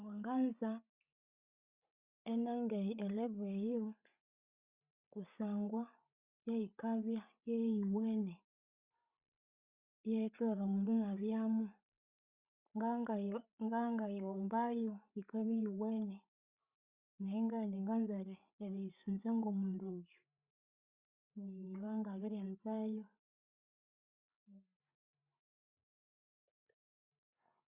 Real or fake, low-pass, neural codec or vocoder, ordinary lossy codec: fake; 3.6 kHz; codec, 16 kHz, 16 kbps, FreqCodec, larger model; Opus, 64 kbps